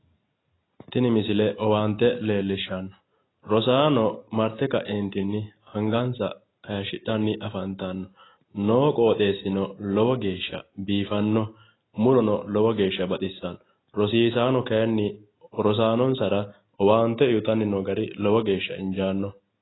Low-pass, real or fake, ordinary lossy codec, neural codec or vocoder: 7.2 kHz; real; AAC, 16 kbps; none